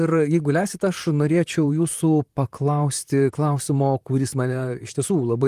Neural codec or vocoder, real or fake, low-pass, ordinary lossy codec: vocoder, 44.1 kHz, 128 mel bands, Pupu-Vocoder; fake; 14.4 kHz; Opus, 24 kbps